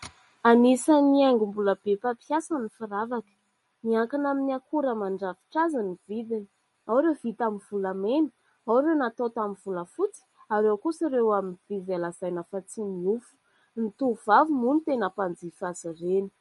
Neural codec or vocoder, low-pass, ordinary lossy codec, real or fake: none; 19.8 kHz; MP3, 48 kbps; real